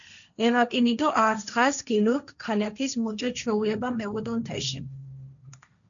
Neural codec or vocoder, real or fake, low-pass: codec, 16 kHz, 1.1 kbps, Voila-Tokenizer; fake; 7.2 kHz